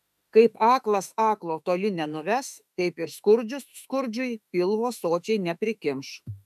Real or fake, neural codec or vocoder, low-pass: fake; autoencoder, 48 kHz, 32 numbers a frame, DAC-VAE, trained on Japanese speech; 14.4 kHz